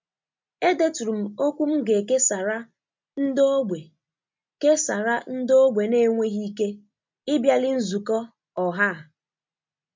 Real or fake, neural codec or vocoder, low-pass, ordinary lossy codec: real; none; 7.2 kHz; MP3, 64 kbps